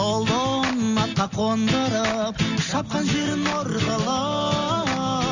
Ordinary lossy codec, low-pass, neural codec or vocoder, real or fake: none; 7.2 kHz; none; real